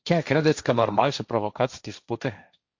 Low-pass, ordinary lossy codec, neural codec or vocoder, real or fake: 7.2 kHz; Opus, 64 kbps; codec, 16 kHz, 1.1 kbps, Voila-Tokenizer; fake